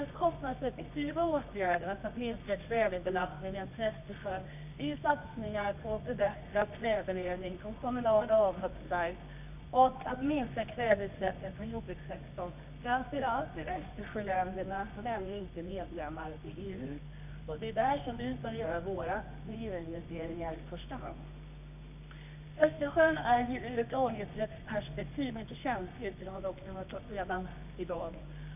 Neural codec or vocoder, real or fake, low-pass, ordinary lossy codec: codec, 24 kHz, 0.9 kbps, WavTokenizer, medium music audio release; fake; 3.6 kHz; none